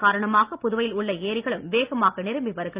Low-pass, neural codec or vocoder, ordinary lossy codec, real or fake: 3.6 kHz; none; Opus, 24 kbps; real